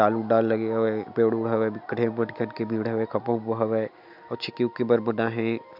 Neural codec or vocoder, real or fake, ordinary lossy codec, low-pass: none; real; none; 5.4 kHz